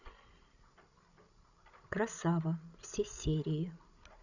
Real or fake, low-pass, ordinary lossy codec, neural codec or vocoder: fake; 7.2 kHz; none; codec, 16 kHz, 16 kbps, FreqCodec, larger model